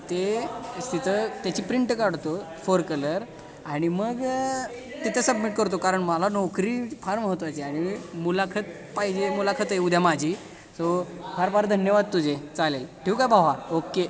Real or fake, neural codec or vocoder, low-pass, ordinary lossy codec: real; none; none; none